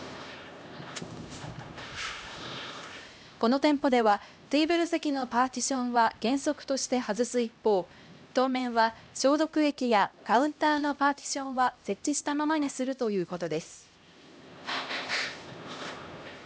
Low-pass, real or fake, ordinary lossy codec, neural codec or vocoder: none; fake; none; codec, 16 kHz, 1 kbps, X-Codec, HuBERT features, trained on LibriSpeech